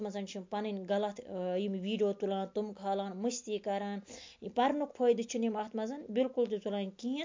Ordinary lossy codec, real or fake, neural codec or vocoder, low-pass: MP3, 48 kbps; real; none; 7.2 kHz